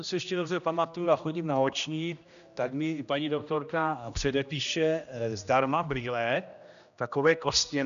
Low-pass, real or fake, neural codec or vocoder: 7.2 kHz; fake; codec, 16 kHz, 1 kbps, X-Codec, HuBERT features, trained on general audio